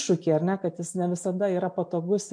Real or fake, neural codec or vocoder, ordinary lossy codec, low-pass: real; none; MP3, 64 kbps; 9.9 kHz